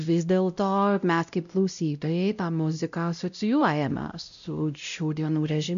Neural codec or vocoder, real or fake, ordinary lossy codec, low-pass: codec, 16 kHz, 0.5 kbps, X-Codec, WavLM features, trained on Multilingual LibriSpeech; fake; AAC, 96 kbps; 7.2 kHz